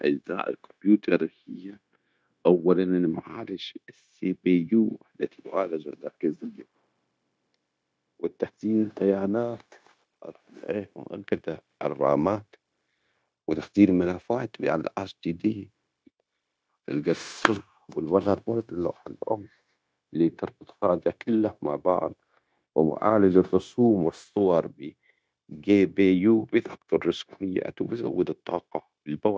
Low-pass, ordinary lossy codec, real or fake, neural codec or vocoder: none; none; fake; codec, 16 kHz, 0.9 kbps, LongCat-Audio-Codec